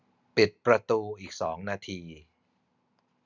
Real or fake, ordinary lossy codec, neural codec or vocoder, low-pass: real; none; none; 7.2 kHz